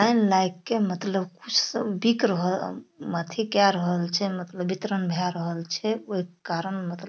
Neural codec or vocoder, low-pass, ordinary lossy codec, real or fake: none; none; none; real